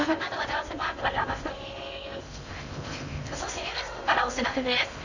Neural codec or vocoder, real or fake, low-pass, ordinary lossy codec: codec, 16 kHz in and 24 kHz out, 0.6 kbps, FocalCodec, streaming, 2048 codes; fake; 7.2 kHz; none